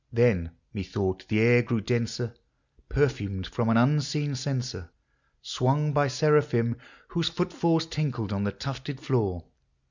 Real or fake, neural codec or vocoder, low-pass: real; none; 7.2 kHz